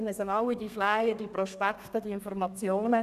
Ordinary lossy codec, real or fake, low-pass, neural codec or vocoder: none; fake; 14.4 kHz; codec, 32 kHz, 1.9 kbps, SNAC